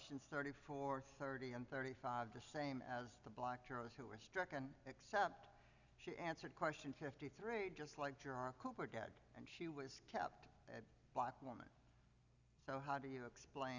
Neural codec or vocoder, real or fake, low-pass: none; real; 7.2 kHz